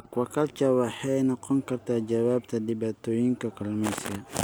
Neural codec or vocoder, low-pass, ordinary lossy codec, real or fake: none; none; none; real